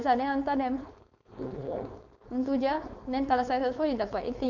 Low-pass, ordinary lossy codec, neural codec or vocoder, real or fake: 7.2 kHz; none; codec, 16 kHz, 4.8 kbps, FACodec; fake